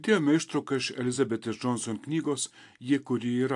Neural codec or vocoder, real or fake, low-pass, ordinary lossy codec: none; real; 10.8 kHz; AAC, 64 kbps